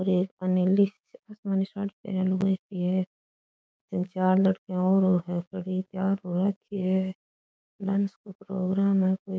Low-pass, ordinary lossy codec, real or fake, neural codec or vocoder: none; none; fake; codec, 16 kHz, 6 kbps, DAC